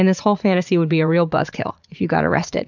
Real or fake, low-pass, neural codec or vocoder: fake; 7.2 kHz; vocoder, 44.1 kHz, 80 mel bands, Vocos